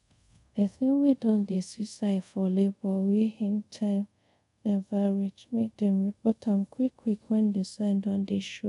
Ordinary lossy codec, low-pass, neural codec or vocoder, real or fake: none; 10.8 kHz; codec, 24 kHz, 0.5 kbps, DualCodec; fake